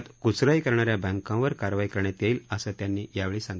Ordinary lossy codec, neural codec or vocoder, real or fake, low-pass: none; none; real; none